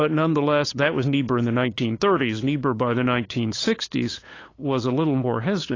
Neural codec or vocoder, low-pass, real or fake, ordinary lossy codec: codec, 16 kHz, 8 kbps, FunCodec, trained on LibriTTS, 25 frames a second; 7.2 kHz; fake; AAC, 32 kbps